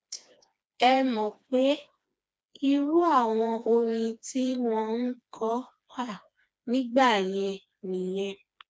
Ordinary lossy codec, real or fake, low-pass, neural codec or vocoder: none; fake; none; codec, 16 kHz, 2 kbps, FreqCodec, smaller model